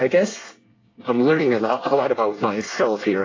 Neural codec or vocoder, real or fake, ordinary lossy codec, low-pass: codec, 24 kHz, 1 kbps, SNAC; fake; AAC, 32 kbps; 7.2 kHz